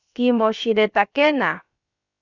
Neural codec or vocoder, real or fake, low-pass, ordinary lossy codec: codec, 16 kHz, about 1 kbps, DyCAST, with the encoder's durations; fake; 7.2 kHz; Opus, 64 kbps